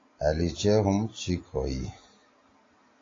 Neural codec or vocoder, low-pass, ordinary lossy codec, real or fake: none; 7.2 kHz; AAC, 32 kbps; real